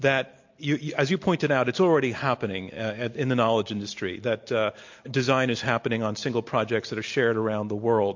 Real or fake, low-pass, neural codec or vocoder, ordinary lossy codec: real; 7.2 kHz; none; MP3, 48 kbps